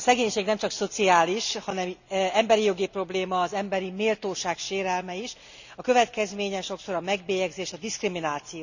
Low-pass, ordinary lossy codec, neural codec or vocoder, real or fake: 7.2 kHz; none; none; real